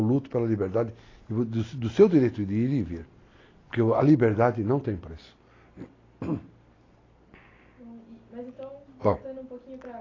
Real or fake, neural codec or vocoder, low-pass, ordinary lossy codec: real; none; 7.2 kHz; AAC, 32 kbps